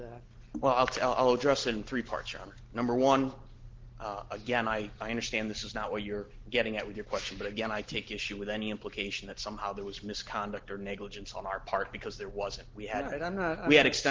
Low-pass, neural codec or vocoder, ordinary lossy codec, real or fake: 7.2 kHz; none; Opus, 16 kbps; real